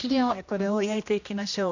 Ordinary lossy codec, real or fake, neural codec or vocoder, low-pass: none; fake; codec, 16 kHz, 1 kbps, X-Codec, HuBERT features, trained on general audio; 7.2 kHz